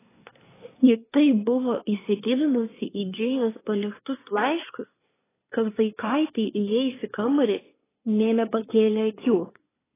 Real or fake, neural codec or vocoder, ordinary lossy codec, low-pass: fake; codec, 24 kHz, 1 kbps, SNAC; AAC, 16 kbps; 3.6 kHz